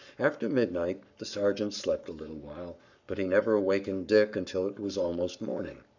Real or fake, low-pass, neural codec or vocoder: fake; 7.2 kHz; codec, 44.1 kHz, 7.8 kbps, Pupu-Codec